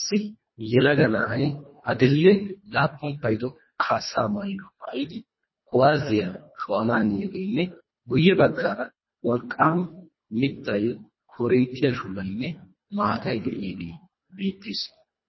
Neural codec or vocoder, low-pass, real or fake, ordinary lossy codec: codec, 24 kHz, 1.5 kbps, HILCodec; 7.2 kHz; fake; MP3, 24 kbps